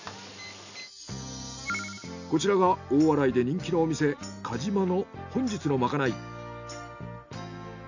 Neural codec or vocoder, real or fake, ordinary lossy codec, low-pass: none; real; none; 7.2 kHz